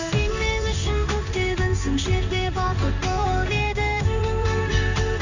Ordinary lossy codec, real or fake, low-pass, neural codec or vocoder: none; fake; 7.2 kHz; codec, 16 kHz in and 24 kHz out, 1 kbps, XY-Tokenizer